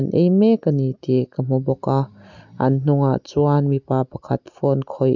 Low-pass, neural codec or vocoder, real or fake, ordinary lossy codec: 7.2 kHz; none; real; none